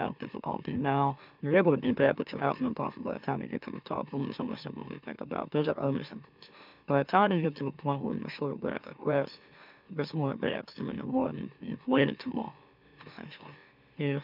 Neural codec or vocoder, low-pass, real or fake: autoencoder, 44.1 kHz, a latent of 192 numbers a frame, MeloTTS; 5.4 kHz; fake